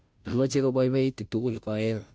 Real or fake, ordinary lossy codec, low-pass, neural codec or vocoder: fake; none; none; codec, 16 kHz, 0.5 kbps, FunCodec, trained on Chinese and English, 25 frames a second